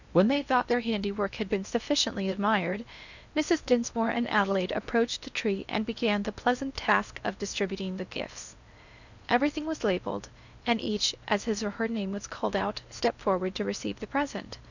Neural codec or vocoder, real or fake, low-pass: codec, 16 kHz in and 24 kHz out, 0.8 kbps, FocalCodec, streaming, 65536 codes; fake; 7.2 kHz